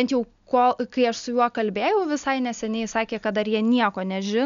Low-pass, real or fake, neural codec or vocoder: 7.2 kHz; real; none